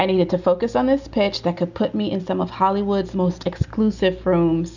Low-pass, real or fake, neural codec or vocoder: 7.2 kHz; real; none